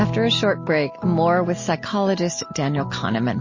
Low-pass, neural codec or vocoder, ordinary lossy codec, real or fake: 7.2 kHz; none; MP3, 32 kbps; real